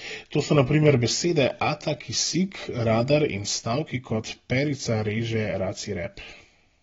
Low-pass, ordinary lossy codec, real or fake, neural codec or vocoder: 7.2 kHz; AAC, 24 kbps; real; none